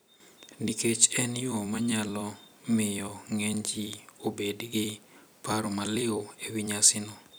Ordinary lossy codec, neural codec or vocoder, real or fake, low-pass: none; vocoder, 44.1 kHz, 128 mel bands every 256 samples, BigVGAN v2; fake; none